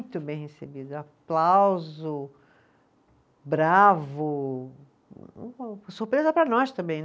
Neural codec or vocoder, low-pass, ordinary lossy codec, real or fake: none; none; none; real